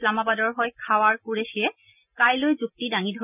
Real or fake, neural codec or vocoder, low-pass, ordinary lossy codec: real; none; 3.6 kHz; none